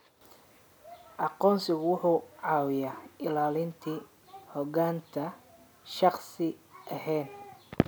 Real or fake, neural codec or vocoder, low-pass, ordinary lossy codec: real; none; none; none